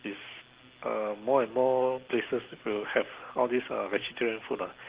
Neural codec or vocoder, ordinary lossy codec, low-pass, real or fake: none; Opus, 16 kbps; 3.6 kHz; real